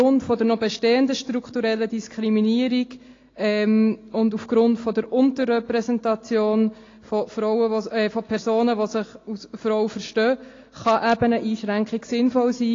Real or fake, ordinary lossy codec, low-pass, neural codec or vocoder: real; AAC, 32 kbps; 7.2 kHz; none